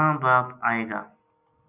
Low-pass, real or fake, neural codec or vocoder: 3.6 kHz; real; none